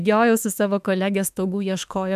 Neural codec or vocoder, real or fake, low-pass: autoencoder, 48 kHz, 32 numbers a frame, DAC-VAE, trained on Japanese speech; fake; 14.4 kHz